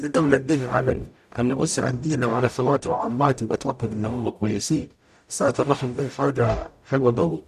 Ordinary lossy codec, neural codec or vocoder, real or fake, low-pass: none; codec, 44.1 kHz, 0.9 kbps, DAC; fake; 14.4 kHz